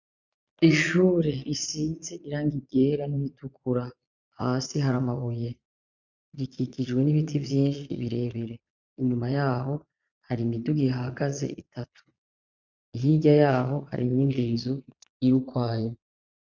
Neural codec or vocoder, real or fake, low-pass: vocoder, 22.05 kHz, 80 mel bands, Vocos; fake; 7.2 kHz